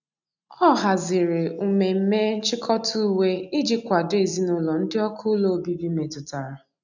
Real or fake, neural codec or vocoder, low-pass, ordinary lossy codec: real; none; 7.2 kHz; none